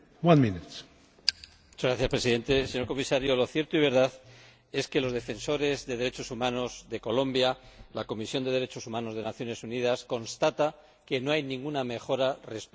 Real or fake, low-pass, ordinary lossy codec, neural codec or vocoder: real; none; none; none